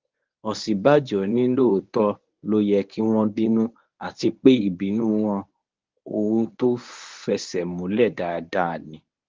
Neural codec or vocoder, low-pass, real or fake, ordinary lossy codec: codec, 24 kHz, 0.9 kbps, WavTokenizer, medium speech release version 1; 7.2 kHz; fake; Opus, 32 kbps